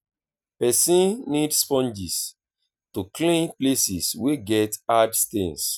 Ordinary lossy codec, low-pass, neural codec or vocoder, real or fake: none; none; none; real